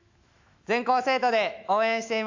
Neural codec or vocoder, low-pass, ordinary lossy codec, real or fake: autoencoder, 48 kHz, 128 numbers a frame, DAC-VAE, trained on Japanese speech; 7.2 kHz; AAC, 48 kbps; fake